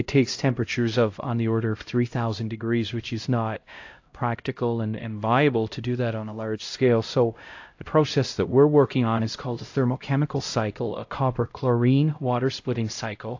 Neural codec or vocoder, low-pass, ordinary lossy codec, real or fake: codec, 16 kHz, 0.5 kbps, X-Codec, HuBERT features, trained on LibriSpeech; 7.2 kHz; AAC, 48 kbps; fake